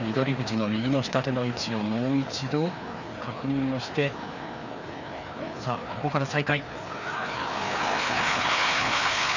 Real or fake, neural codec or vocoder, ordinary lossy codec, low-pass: fake; codec, 16 kHz, 2 kbps, FreqCodec, larger model; none; 7.2 kHz